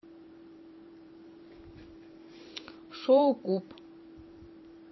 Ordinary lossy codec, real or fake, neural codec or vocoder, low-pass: MP3, 24 kbps; fake; vocoder, 44.1 kHz, 128 mel bands every 512 samples, BigVGAN v2; 7.2 kHz